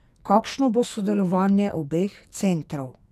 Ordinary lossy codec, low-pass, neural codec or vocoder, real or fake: none; 14.4 kHz; codec, 44.1 kHz, 2.6 kbps, SNAC; fake